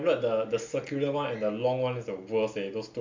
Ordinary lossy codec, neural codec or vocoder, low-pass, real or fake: MP3, 64 kbps; none; 7.2 kHz; real